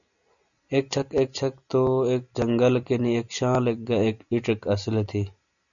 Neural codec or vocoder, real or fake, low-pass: none; real; 7.2 kHz